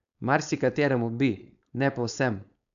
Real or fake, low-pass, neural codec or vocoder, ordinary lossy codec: fake; 7.2 kHz; codec, 16 kHz, 4.8 kbps, FACodec; none